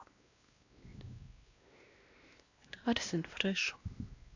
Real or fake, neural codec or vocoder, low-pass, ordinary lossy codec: fake; codec, 16 kHz, 1 kbps, X-Codec, WavLM features, trained on Multilingual LibriSpeech; 7.2 kHz; AAC, 48 kbps